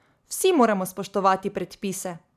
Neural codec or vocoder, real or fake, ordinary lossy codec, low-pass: none; real; none; 14.4 kHz